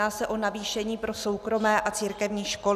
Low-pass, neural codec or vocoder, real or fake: 14.4 kHz; none; real